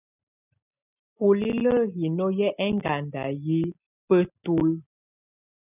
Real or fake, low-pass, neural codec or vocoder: real; 3.6 kHz; none